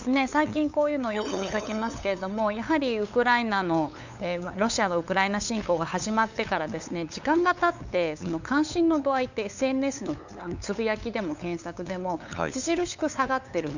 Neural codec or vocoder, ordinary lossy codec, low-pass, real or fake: codec, 16 kHz, 8 kbps, FunCodec, trained on LibriTTS, 25 frames a second; none; 7.2 kHz; fake